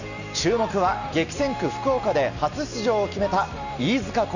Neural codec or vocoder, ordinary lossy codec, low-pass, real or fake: none; none; 7.2 kHz; real